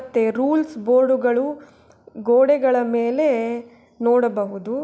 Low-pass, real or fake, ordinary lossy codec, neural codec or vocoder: none; real; none; none